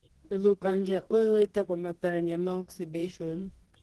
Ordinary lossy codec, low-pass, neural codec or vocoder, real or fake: Opus, 16 kbps; 10.8 kHz; codec, 24 kHz, 0.9 kbps, WavTokenizer, medium music audio release; fake